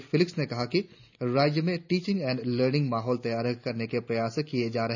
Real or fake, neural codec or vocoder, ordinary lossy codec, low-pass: real; none; none; 7.2 kHz